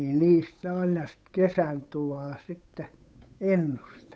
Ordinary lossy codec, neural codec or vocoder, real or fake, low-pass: none; codec, 16 kHz, 8 kbps, FunCodec, trained on Chinese and English, 25 frames a second; fake; none